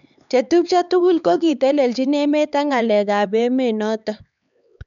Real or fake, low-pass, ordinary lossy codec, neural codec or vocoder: fake; 7.2 kHz; none; codec, 16 kHz, 4 kbps, X-Codec, HuBERT features, trained on LibriSpeech